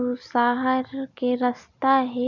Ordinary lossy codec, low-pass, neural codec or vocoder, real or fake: none; 7.2 kHz; none; real